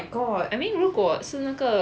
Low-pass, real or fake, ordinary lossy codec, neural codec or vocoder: none; real; none; none